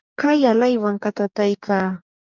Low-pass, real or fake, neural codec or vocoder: 7.2 kHz; fake; codec, 44.1 kHz, 2.6 kbps, DAC